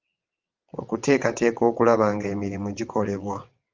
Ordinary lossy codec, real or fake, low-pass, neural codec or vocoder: Opus, 24 kbps; fake; 7.2 kHz; vocoder, 22.05 kHz, 80 mel bands, WaveNeXt